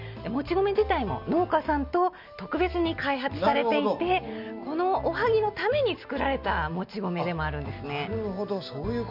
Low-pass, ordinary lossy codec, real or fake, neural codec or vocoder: 5.4 kHz; none; real; none